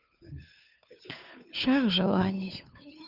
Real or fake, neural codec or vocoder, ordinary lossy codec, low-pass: fake; codec, 16 kHz, 2 kbps, FunCodec, trained on Chinese and English, 25 frames a second; none; 5.4 kHz